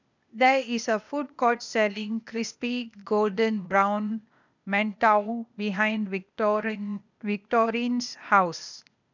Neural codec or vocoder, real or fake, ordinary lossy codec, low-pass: codec, 16 kHz, 0.8 kbps, ZipCodec; fake; none; 7.2 kHz